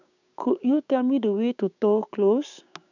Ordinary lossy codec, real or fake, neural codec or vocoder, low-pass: none; fake; codec, 16 kHz, 6 kbps, DAC; 7.2 kHz